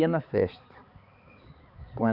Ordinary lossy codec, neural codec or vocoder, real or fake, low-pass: Opus, 64 kbps; codec, 16 kHz, 4 kbps, X-Codec, HuBERT features, trained on balanced general audio; fake; 5.4 kHz